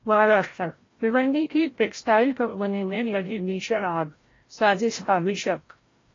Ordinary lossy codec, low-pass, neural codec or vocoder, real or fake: AAC, 32 kbps; 7.2 kHz; codec, 16 kHz, 0.5 kbps, FreqCodec, larger model; fake